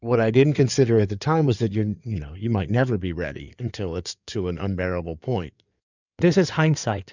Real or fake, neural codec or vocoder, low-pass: fake; codec, 16 kHz in and 24 kHz out, 2.2 kbps, FireRedTTS-2 codec; 7.2 kHz